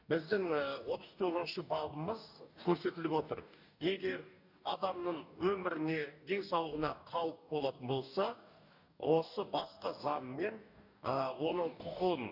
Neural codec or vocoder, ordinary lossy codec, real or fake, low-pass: codec, 44.1 kHz, 2.6 kbps, DAC; none; fake; 5.4 kHz